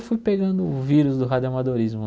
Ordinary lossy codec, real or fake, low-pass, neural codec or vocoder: none; real; none; none